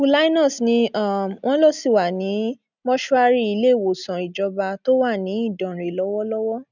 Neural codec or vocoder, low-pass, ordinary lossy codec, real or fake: none; 7.2 kHz; none; real